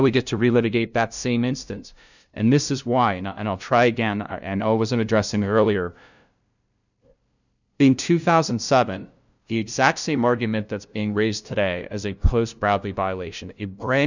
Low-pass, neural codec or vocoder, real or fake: 7.2 kHz; codec, 16 kHz, 0.5 kbps, FunCodec, trained on LibriTTS, 25 frames a second; fake